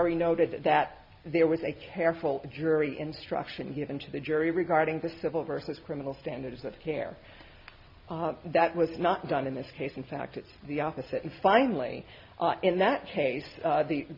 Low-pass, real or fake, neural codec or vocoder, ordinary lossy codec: 5.4 kHz; real; none; MP3, 48 kbps